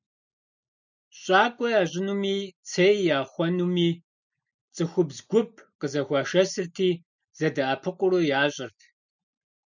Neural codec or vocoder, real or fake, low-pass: none; real; 7.2 kHz